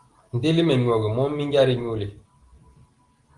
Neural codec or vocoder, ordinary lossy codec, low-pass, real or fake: none; Opus, 24 kbps; 10.8 kHz; real